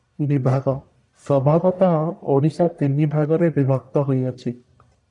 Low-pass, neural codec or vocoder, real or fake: 10.8 kHz; codec, 44.1 kHz, 1.7 kbps, Pupu-Codec; fake